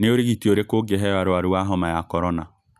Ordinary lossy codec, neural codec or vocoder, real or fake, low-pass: none; none; real; 14.4 kHz